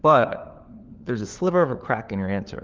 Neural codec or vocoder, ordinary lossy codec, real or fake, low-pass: codec, 16 kHz, 4 kbps, FunCodec, trained on LibriTTS, 50 frames a second; Opus, 32 kbps; fake; 7.2 kHz